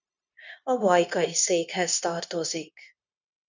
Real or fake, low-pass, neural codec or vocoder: fake; 7.2 kHz; codec, 16 kHz, 0.9 kbps, LongCat-Audio-Codec